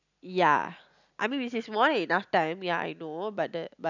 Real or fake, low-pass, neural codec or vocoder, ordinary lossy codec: real; 7.2 kHz; none; none